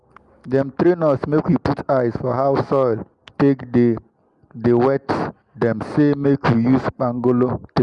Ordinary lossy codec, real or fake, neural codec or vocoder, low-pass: Opus, 32 kbps; real; none; 10.8 kHz